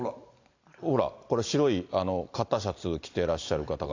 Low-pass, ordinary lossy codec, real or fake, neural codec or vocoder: 7.2 kHz; AAC, 48 kbps; real; none